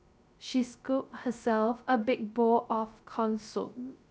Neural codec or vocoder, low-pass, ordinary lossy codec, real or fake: codec, 16 kHz, 0.3 kbps, FocalCodec; none; none; fake